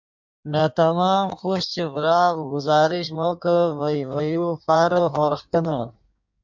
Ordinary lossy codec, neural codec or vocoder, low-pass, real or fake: MP3, 64 kbps; codec, 16 kHz in and 24 kHz out, 1.1 kbps, FireRedTTS-2 codec; 7.2 kHz; fake